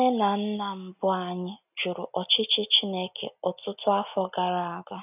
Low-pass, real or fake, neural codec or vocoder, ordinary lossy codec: 3.6 kHz; real; none; none